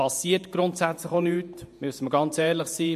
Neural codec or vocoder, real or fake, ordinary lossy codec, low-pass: vocoder, 48 kHz, 128 mel bands, Vocos; fake; MP3, 64 kbps; 14.4 kHz